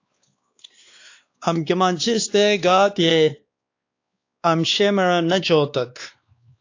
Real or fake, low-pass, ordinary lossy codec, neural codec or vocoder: fake; 7.2 kHz; AAC, 48 kbps; codec, 16 kHz, 2 kbps, X-Codec, WavLM features, trained on Multilingual LibriSpeech